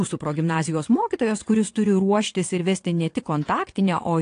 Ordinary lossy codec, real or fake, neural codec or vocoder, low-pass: AAC, 48 kbps; fake; vocoder, 22.05 kHz, 80 mel bands, WaveNeXt; 9.9 kHz